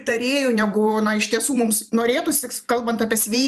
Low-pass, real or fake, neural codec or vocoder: 14.4 kHz; fake; vocoder, 44.1 kHz, 128 mel bands every 512 samples, BigVGAN v2